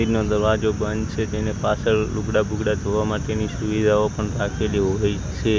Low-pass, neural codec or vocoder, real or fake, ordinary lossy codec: none; none; real; none